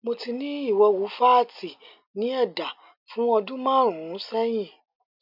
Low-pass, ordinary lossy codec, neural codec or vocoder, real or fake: 5.4 kHz; none; none; real